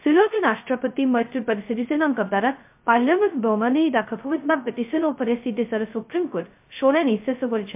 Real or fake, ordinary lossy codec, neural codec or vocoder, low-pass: fake; MP3, 32 kbps; codec, 16 kHz, 0.2 kbps, FocalCodec; 3.6 kHz